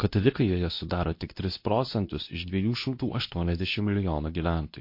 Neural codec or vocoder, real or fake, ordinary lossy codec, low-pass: codec, 24 kHz, 0.9 kbps, WavTokenizer, medium speech release version 2; fake; MP3, 32 kbps; 5.4 kHz